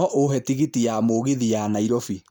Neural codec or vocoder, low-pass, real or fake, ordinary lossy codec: none; none; real; none